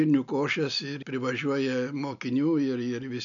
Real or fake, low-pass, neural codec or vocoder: real; 7.2 kHz; none